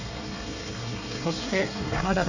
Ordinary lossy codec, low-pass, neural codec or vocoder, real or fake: none; 7.2 kHz; codec, 24 kHz, 1 kbps, SNAC; fake